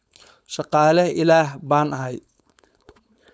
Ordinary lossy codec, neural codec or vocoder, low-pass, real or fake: none; codec, 16 kHz, 4.8 kbps, FACodec; none; fake